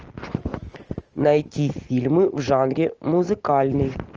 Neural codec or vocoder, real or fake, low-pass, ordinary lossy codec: none; real; 7.2 kHz; Opus, 24 kbps